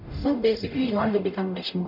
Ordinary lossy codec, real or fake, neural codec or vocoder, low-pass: none; fake; codec, 44.1 kHz, 0.9 kbps, DAC; 5.4 kHz